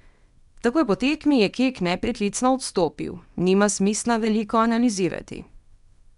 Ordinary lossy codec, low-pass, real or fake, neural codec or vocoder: none; 10.8 kHz; fake; codec, 24 kHz, 0.9 kbps, WavTokenizer, small release